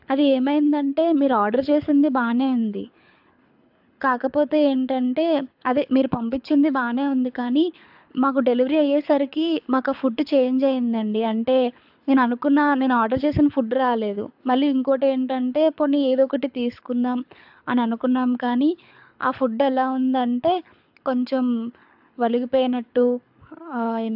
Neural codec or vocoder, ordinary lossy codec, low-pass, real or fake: codec, 24 kHz, 6 kbps, HILCodec; AAC, 48 kbps; 5.4 kHz; fake